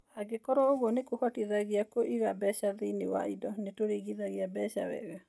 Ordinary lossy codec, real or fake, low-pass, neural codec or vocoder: none; real; none; none